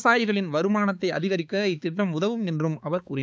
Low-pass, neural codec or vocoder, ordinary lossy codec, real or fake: none; codec, 16 kHz, 4 kbps, X-Codec, HuBERT features, trained on balanced general audio; none; fake